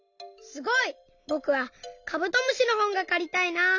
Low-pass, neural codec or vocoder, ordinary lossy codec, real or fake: 7.2 kHz; none; none; real